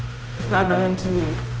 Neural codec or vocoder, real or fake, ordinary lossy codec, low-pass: codec, 16 kHz, 0.5 kbps, X-Codec, HuBERT features, trained on general audio; fake; none; none